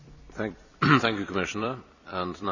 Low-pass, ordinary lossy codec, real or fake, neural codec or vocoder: 7.2 kHz; none; fake; vocoder, 44.1 kHz, 128 mel bands every 256 samples, BigVGAN v2